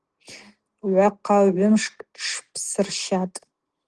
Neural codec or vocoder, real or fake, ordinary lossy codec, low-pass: none; real; Opus, 16 kbps; 10.8 kHz